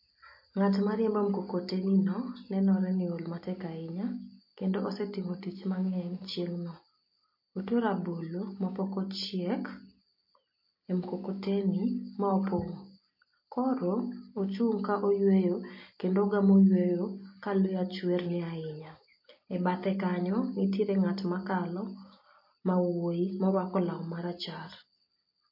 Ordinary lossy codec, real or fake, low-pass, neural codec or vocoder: MP3, 32 kbps; real; 5.4 kHz; none